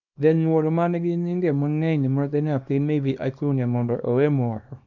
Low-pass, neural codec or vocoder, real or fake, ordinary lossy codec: 7.2 kHz; codec, 24 kHz, 0.9 kbps, WavTokenizer, small release; fake; none